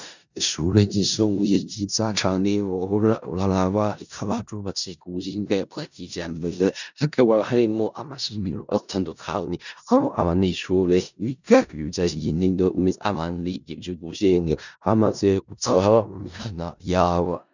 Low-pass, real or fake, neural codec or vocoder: 7.2 kHz; fake; codec, 16 kHz in and 24 kHz out, 0.4 kbps, LongCat-Audio-Codec, four codebook decoder